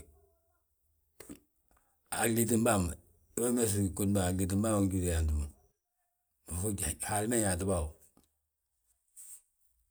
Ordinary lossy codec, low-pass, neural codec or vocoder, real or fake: none; none; none; real